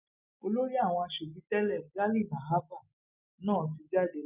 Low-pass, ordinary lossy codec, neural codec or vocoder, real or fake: 3.6 kHz; none; none; real